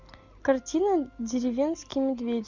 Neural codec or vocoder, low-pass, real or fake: none; 7.2 kHz; real